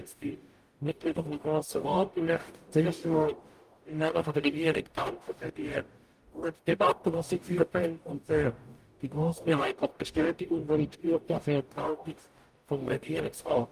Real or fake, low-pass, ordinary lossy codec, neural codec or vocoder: fake; 14.4 kHz; Opus, 32 kbps; codec, 44.1 kHz, 0.9 kbps, DAC